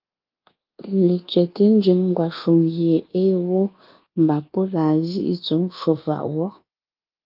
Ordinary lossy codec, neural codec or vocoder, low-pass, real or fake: Opus, 32 kbps; codec, 24 kHz, 1.2 kbps, DualCodec; 5.4 kHz; fake